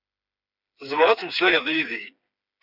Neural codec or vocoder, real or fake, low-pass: codec, 16 kHz, 4 kbps, FreqCodec, smaller model; fake; 5.4 kHz